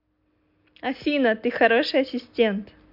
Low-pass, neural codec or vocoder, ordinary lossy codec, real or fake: 5.4 kHz; none; MP3, 48 kbps; real